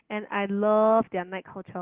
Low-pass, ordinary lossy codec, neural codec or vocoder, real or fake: 3.6 kHz; Opus, 16 kbps; none; real